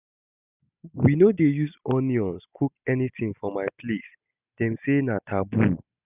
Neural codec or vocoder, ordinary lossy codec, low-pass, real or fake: none; none; 3.6 kHz; real